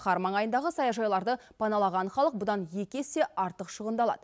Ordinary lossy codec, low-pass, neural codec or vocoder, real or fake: none; none; none; real